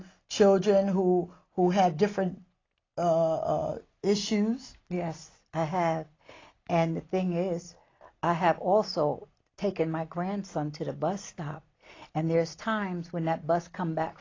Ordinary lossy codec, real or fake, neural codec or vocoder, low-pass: AAC, 32 kbps; real; none; 7.2 kHz